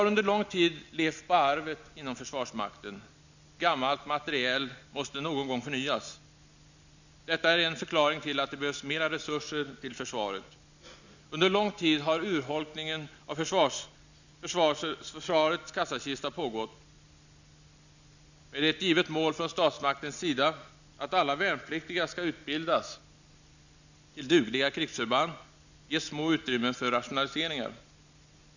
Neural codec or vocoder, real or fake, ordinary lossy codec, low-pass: none; real; none; 7.2 kHz